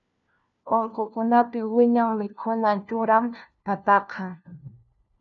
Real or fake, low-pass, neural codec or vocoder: fake; 7.2 kHz; codec, 16 kHz, 1 kbps, FunCodec, trained on LibriTTS, 50 frames a second